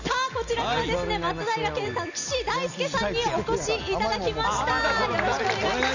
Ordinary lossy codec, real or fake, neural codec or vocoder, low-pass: none; real; none; 7.2 kHz